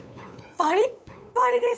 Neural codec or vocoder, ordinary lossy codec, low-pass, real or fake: codec, 16 kHz, 8 kbps, FunCodec, trained on LibriTTS, 25 frames a second; none; none; fake